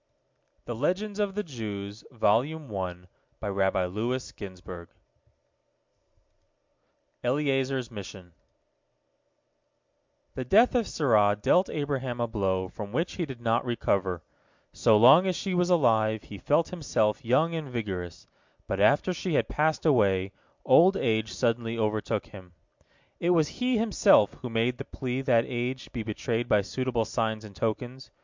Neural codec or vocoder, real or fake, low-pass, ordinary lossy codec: none; real; 7.2 kHz; MP3, 64 kbps